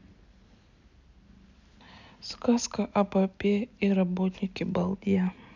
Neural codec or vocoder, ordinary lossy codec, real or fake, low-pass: none; none; real; 7.2 kHz